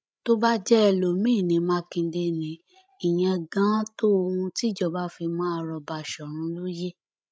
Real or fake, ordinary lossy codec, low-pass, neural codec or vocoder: fake; none; none; codec, 16 kHz, 16 kbps, FreqCodec, larger model